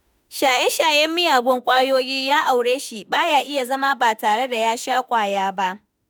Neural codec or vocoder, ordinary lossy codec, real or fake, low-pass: autoencoder, 48 kHz, 32 numbers a frame, DAC-VAE, trained on Japanese speech; none; fake; none